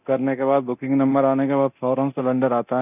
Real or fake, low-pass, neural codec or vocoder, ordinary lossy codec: fake; 3.6 kHz; codec, 24 kHz, 0.9 kbps, DualCodec; none